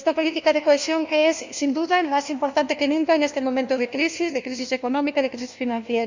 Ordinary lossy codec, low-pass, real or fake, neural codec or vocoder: Opus, 64 kbps; 7.2 kHz; fake; codec, 16 kHz, 1 kbps, FunCodec, trained on LibriTTS, 50 frames a second